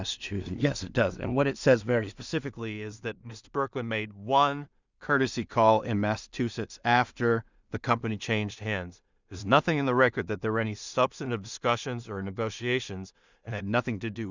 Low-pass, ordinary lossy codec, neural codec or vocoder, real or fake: 7.2 kHz; Opus, 64 kbps; codec, 16 kHz in and 24 kHz out, 0.4 kbps, LongCat-Audio-Codec, two codebook decoder; fake